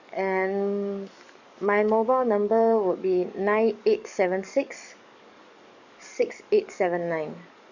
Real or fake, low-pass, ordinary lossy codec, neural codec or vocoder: fake; 7.2 kHz; none; codec, 44.1 kHz, 7.8 kbps, DAC